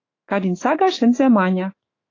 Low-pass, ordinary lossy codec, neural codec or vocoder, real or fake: 7.2 kHz; AAC, 32 kbps; autoencoder, 48 kHz, 128 numbers a frame, DAC-VAE, trained on Japanese speech; fake